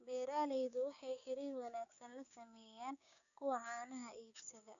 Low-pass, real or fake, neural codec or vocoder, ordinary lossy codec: 7.2 kHz; fake; codec, 16 kHz, 6 kbps, DAC; none